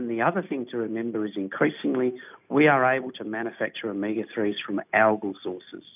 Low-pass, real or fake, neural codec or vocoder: 3.6 kHz; real; none